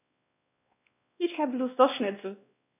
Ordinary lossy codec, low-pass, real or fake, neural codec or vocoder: none; 3.6 kHz; fake; codec, 16 kHz, 1 kbps, X-Codec, WavLM features, trained on Multilingual LibriSpeech